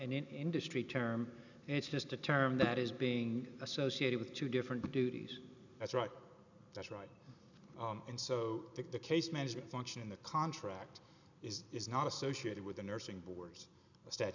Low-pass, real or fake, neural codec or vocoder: 7.2 kHz; real; none